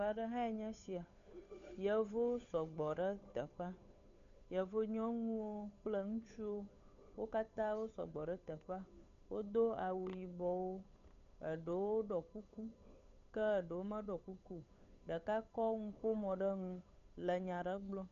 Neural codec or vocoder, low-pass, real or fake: codec, 16 kHz, 8 kbps, FunCodec, trained on Chinese and English, 25 frames a second; 7.2 kHz; fake